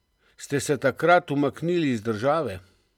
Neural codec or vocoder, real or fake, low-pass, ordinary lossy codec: none; real; 19.8 kHz; none